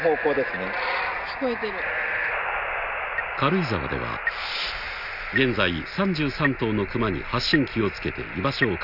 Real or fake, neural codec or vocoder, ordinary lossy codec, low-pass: real; none; none; 5.4 kHz